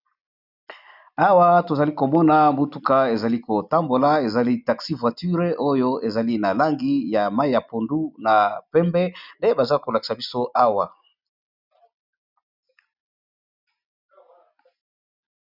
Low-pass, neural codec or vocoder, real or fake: 5.4 kHz; none; real